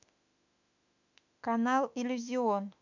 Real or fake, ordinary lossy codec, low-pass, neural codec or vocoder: fake; none; 7.2 kHz; autoencoder, 48 kHz, 32 numbers a frame, DAC-VAE, trained on Japanese speech